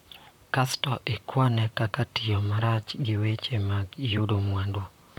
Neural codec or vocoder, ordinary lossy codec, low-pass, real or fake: vocoder, 44.1 kHz, 128 mel bands, Pupu-Vocoder; none; 19.8 kHz; fake